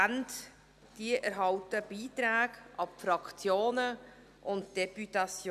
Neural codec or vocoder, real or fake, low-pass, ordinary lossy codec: none; real; 14.4 kHz; none